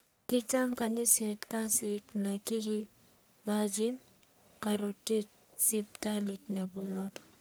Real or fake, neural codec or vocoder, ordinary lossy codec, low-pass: fake; codec, 44.1 kHz, 1.7 kbps, Pupu-Codec; none; none